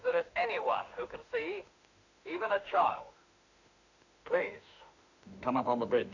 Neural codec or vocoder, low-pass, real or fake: autoencoder, 48 kHz, 32 numbers a frame, DAC-VAE, trained on Japanese speech; 7.2 kHz; fake